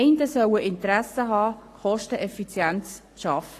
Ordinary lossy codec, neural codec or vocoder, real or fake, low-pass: AAC, 48 kbps; codec, 44.1 kHz, 7.8 kbps, Pupu-Codec; fake; 14.4 kHz